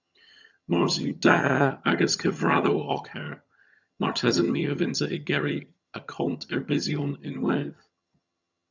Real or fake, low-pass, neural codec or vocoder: fake; 7.2 kHz; vocoder, 22.05 kHz, 80 mel bands, HiFi-GAN